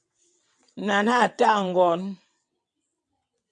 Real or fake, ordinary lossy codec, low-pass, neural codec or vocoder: fake; MP3, 96 kbps; 9.9 kHz; vocoder, 22.05 kHz, 80 mel bands, WaveNeXt